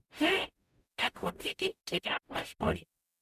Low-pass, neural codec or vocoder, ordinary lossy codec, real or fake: 14.4 kHz; codec, 44.1 kHz, 0.9 kbps, DAC; none; fake